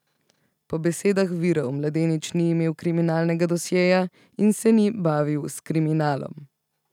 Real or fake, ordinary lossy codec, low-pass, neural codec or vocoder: real; none; 19.8 kHz; none